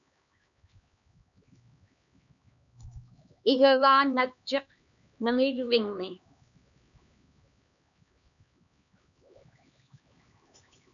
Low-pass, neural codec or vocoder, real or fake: 7.2 kHz; codec, 16 kHz, 2 kbps, X-Codec, HuBERT features, trained on LibriSpeech; fake